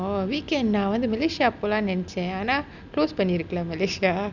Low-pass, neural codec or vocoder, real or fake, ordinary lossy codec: 7.2 kHz; none; real; none